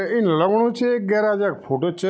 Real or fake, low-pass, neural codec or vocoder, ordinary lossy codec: real; none; none; none